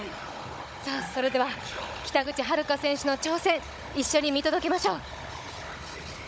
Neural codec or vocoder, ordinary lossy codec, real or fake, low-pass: codec, 16 kHz, 16 kbps, FunCodec, trained on Chinese and English, 50 frames a second; none; fake; none